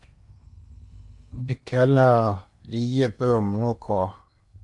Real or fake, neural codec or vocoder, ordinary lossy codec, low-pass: fake; codec, 16 kHz in and 24 kHz out, 0.8 kbps, FocalCodec, streaming, 65536 codes; MP3, 64 kbps; 10.8 kHz